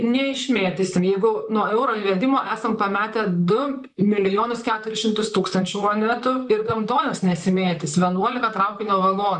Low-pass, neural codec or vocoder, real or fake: 10.8 kHz; vocoder, 44.1 kHz, 128 mel bands, Pupu-Vocoder; fake